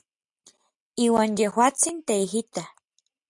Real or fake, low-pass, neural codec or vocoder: real; 10.8 kHz; none